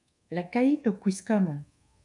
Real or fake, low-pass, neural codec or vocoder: fake; 10.8 kHz; codec, 24 kHz, 1.2 kbps, DualCodec